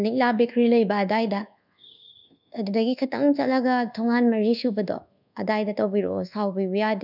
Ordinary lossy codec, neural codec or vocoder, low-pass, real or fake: none; codec, 24 kHz, 1.2 kbps, DualCodec; 5.4 kHz; fake